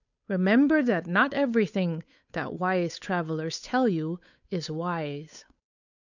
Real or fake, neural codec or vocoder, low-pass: fake; codec, 16 kHz, 8 kbps, FunCodec, trained on Chinese and English, 25 frames a second; 7.2 kHz